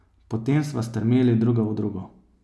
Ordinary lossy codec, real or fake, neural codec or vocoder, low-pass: none; real; none; none